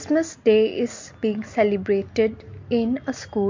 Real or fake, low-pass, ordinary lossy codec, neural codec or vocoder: real; 7.2 kHz; MP3, 48 kbps; none